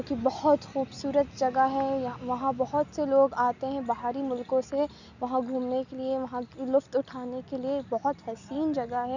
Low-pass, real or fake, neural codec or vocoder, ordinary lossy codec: 7.2 kHz; real; none; none